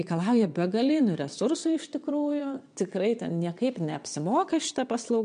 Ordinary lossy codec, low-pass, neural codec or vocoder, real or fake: MP3, 64 kbps; 9.9 kHz; vocoder, 22.05 kHz, 80 mel bands, WaveNeXt; fake